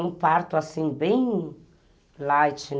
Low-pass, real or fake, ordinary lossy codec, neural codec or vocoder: none; real; none; none